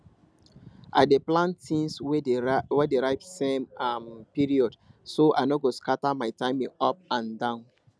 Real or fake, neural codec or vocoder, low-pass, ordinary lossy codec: real; none; none; none